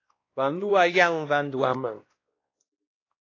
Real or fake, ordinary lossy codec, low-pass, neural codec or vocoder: fake; AAC, 32 kbps; 7.2 kHz; codec, 16 kHz, 1 kbps, X-Codec, WavLM features, trained on Multilingual LibriSpeech